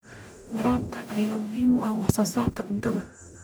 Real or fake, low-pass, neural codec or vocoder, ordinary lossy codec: fake; none; codec, 44.1 kHz, 0.9 kbps, DAC; none